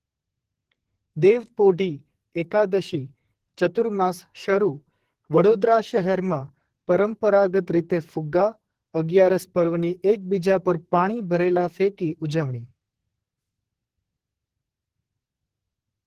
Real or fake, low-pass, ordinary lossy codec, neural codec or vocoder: fake; 14.4 kHz; Opus, 16 kbps; codec, 44.1 kHz, 2.6 kbps, SNAC